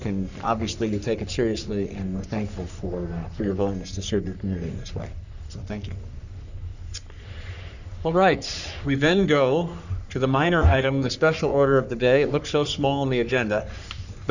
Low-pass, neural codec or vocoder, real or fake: 7.2 kHz; codec, 44.1 kHz, 3.4 kbps, Pupu-Codec; fake